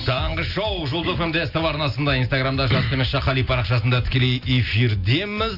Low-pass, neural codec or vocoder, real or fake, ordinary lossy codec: 5.4 kHz; none; real; none